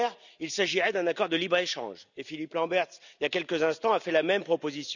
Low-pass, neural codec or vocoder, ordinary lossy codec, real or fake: 7.2 kHz; none; none; real